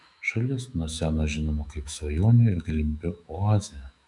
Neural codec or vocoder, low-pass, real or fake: autoencoder, 48 kHz, 128 numbers a frame, DAC-VAE, trained on Japanese speech; 10.8 kHz; fake